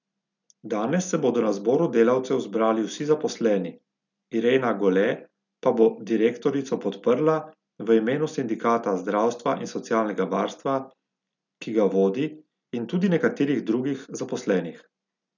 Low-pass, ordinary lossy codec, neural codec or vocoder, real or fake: 7.2 kHz; none; none; real